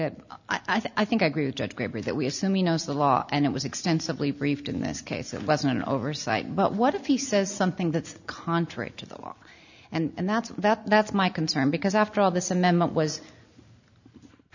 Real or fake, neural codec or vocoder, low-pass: real; none; 7.2 kHz